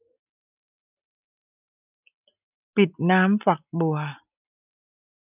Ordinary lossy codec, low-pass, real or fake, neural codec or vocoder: none; 3.6 kHz; real; none